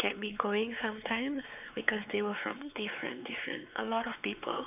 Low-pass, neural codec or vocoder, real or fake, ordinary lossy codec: 3.6 kHz; codec, 16 kHz, 4 kbps, X-Codec, WavLM features, trained on Multilingual LibriSpeech; fake; none